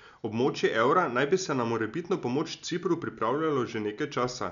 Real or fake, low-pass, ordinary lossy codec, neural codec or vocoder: real; 7.2 kHz; none; none